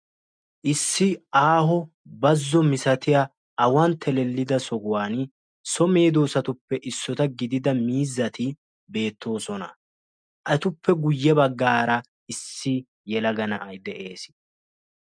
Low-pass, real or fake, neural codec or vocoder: 9.9 kHz; real; none